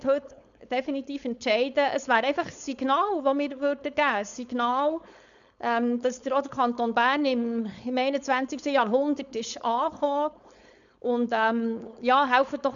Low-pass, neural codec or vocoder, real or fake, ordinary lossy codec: 7.2 kHz; codec, 16 kHz, 4.8 kbps, FACodec; fake; none